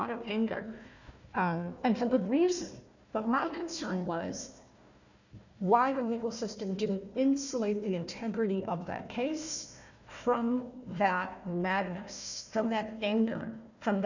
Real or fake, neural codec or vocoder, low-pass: fake; codec, 16 kHz, 1 kbps, FunCodec, trained on Chinese and English, 50 frames a second; 7.2 kHz